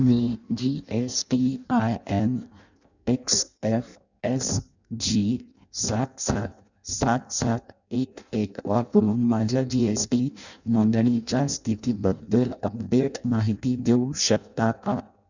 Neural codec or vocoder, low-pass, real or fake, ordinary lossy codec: codec, 16 kHz in and 24 kHz out, 0.6 kbps, FireRedTTS-2 codec; 7.2 kHz; fake; none